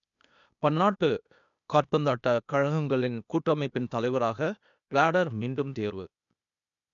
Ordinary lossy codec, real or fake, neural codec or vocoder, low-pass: none; fake; codec, 16 kHz, 0.8 kbps, ZipCodec; 7.2 kHz